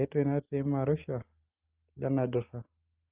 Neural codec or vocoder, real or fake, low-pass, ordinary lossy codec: none; real; 3.6 kHz; Opus, 24 kbps